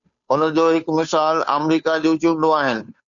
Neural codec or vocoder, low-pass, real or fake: codec, 16 kHz, 2 kbps, FunCodec, trained on Chinese and English, 25 frames a second; 7.2 kHz; fake